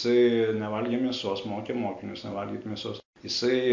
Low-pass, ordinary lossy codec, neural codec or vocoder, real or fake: 7.2 kHz; MP3, 48 kbps; none; real